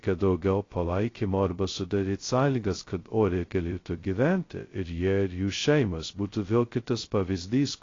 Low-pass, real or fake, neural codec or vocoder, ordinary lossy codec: 7.2 kHz; fake; codec, 16 kHz, 0.2 kbps, FocalCodec; AAC, 32 kbps